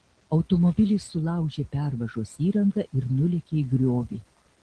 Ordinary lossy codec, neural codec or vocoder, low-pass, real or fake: Opus, 16 kbps; none; 9.9 kHz; real